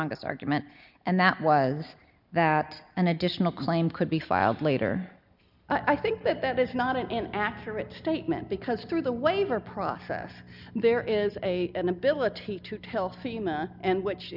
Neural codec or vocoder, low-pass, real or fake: none; 5.4 kHz; real